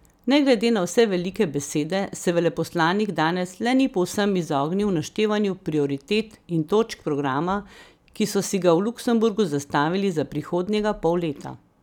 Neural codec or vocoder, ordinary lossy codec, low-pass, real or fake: vocoder, 44.1 kHz, 128 mel bands every 256 samples, BigVGAN v2; none; 19.8 kHz; fake